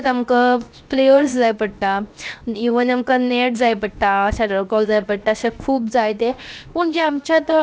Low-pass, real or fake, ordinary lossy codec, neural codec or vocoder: none; fake; none; codec, 16 kHz, 0.7 kbps, FocalCodec